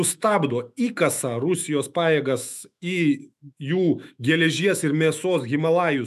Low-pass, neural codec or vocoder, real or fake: 14.4 kHz; autoencoder, 48 kHz, 128 numbers a frame, DAC-VAE, trained on Japanese speech; fake